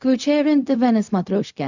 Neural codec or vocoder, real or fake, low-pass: codec, 16 kHz, 0.4 kbps, LongCat-Audio-Codec; fake; 7.2 kHz